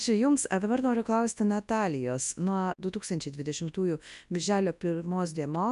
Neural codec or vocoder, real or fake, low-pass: codec, 24 kHz, 0.9 kbps, WavTokenizer, large speech release; fake; 10.8 kHz